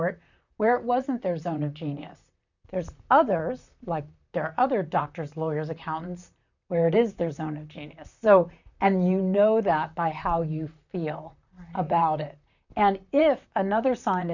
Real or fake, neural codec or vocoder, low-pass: fake; vocoder, 44.1 kHz, 128 mel bands, Pupu-Vocoder; 7.2 kHz